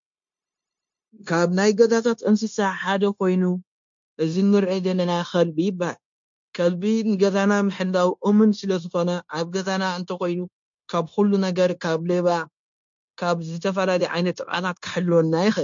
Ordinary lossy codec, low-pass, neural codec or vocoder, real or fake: MP3, 48 kbps; 7.2 kHz; codec, 16 kHz, 0.9 kbps, LongCat-Audio-Codec; fake